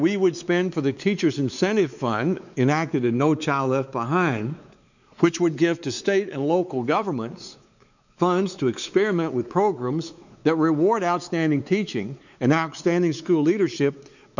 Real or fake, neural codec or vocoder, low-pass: fake; codec, 16 kHz, 4 kbps, X-Codec, WavLM features, trained on Multilingual LibriSpeech; 7.2 kHz